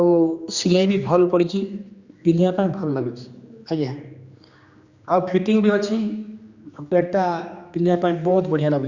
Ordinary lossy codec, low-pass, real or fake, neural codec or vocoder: Opus, 64 kbps; 7.2 kHz; fake; codec, 16 kHz, 2 kbps, X-Codec, HuBERT features, trained on general audio